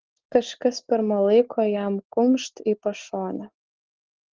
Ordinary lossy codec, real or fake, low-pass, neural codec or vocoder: Opus, 16 kbps; real; 7.2 kHz; none